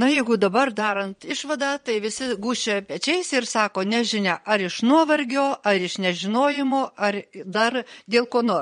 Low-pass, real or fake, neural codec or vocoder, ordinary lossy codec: 9.9 kHz; fake; vocoder, 22.05 kHz, 80 mel bands, Vocos; MP3, 48 kbps